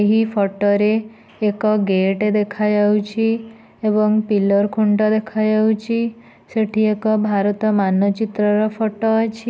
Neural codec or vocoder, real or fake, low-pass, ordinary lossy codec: none; real; none; none